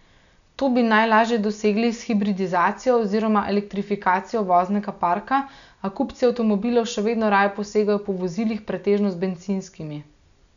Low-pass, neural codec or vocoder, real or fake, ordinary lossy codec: 7.2 kHz; none; real; none